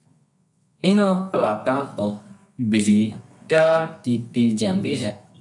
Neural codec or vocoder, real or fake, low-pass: codec, 24 kHz, 0.9 kbps, WavTokenizer, medium music audio release; fake; 10.8 kHz